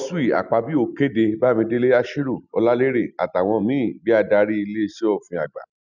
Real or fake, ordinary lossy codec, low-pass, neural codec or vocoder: real; none; 7.2 kHz; none